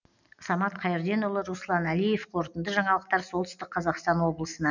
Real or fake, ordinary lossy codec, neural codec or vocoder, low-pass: real; none; none; 7.2 kHz